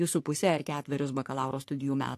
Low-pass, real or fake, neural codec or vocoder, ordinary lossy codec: 14.4 kHz; fake; codec, 44.1 kHz, 3.4 kbps, Pupu-Codec; AAC, 64 kbps